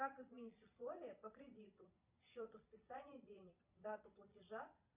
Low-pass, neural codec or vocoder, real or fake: 3.6 kHz; vocoder, 22.05 kHz, 80 mel bands, Vocos; fake